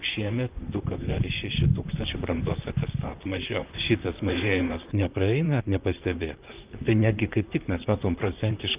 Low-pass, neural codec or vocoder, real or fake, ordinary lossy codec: 3.6 kHz; vocoder, 44.1 kHz, 128 mel bands, Pupu-Vocoder; fake; Opus, 24 kbps